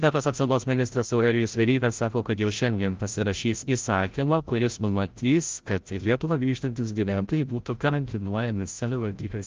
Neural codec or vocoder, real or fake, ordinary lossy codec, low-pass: codec, 16 kHz, 0.5 kbps, FreqCodec, larger model; fake; Opus, 32 kbps; 7.2 kHz